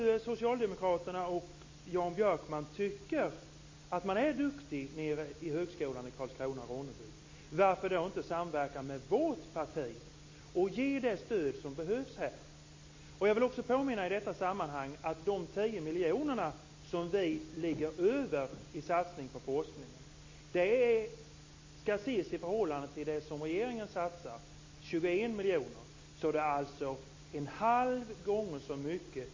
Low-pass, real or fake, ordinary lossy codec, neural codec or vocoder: 7.2 kHz; real; MP3, 32 kbps; none